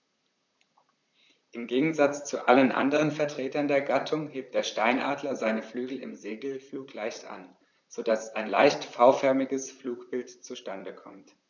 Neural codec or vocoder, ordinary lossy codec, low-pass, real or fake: vocoder, 44.1 kHz, 128 mel bands, Pupu-Vocoder; none; 7.2 kHz; fake